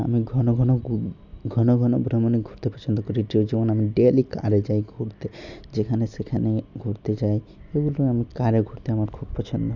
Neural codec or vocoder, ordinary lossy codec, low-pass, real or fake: none; none; 7.2 kHz; real